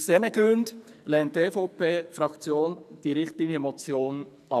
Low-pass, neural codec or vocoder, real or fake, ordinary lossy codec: 14.4 kHz; codec, 44.1 kHz, 2.6 kbps, SNAC; fake; none